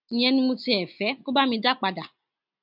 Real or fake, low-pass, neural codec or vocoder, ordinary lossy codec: real; 5.4 kHz; none; none